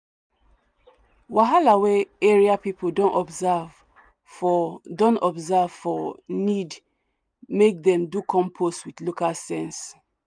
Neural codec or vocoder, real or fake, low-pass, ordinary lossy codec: none; real; 9.9 kHz; none